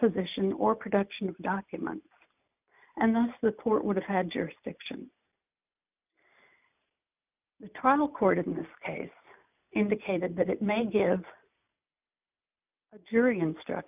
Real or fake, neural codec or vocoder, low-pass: real; none; 3.6 kHz